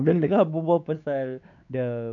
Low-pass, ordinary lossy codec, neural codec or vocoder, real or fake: 7.2 kHz; none; codec, 16 kHz, 4 kbps, X-Codec, WavLM features, trained on Multilingual LibriSpeech; fake